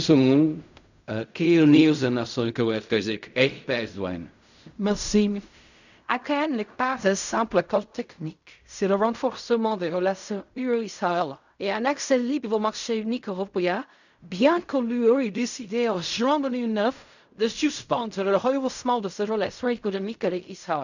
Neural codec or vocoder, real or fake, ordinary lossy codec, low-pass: codec, 16 kHz in and 24 kHz out, 0.4 kbps, LongCat-Audio-Codec, fine tuned four codebook decoder; fake; none; 7.2 kHz